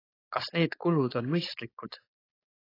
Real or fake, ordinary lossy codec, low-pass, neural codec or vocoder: fake; AAC, 24 kbps; 5.4 kHz; codec, 16 kHz, 4.8 kbps, FACodec